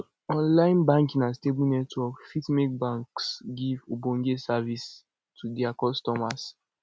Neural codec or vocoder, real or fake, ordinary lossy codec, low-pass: none; real; none; none